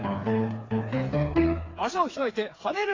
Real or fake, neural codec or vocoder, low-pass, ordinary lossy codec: fake; codec, 16 kHz, 4 kbps, FreqCodec, smaller model; 7.2 kHz; AAC, 32 kbps